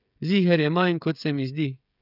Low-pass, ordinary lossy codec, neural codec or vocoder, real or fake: 5.4 kHz; none; codec, 16 kHz, 16 kbps, FreqCodec, smaller model; fake